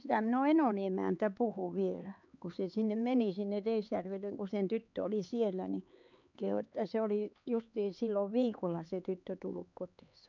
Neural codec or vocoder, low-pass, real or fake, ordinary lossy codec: codec, 16 kHz, 4 kbps, X-Codec, HuBERT features, trained on LibriSpeech; 7.2 kHz; fake; none